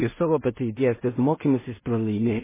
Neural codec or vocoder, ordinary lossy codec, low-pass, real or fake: codec, 16 kHz in and 24 kHz out, 0.4 kbps, LongCat-Audio-Codec, two codebook decoder; MP3, 16 kbps; 3.6 kHz; fake